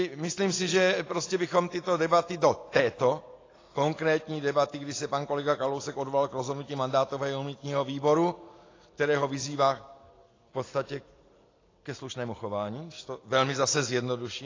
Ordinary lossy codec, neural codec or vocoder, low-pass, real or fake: AAC, 32 kbps; none; 7.2 kHz; real